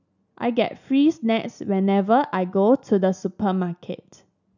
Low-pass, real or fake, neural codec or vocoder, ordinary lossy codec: 7.2 kHz; real; none; none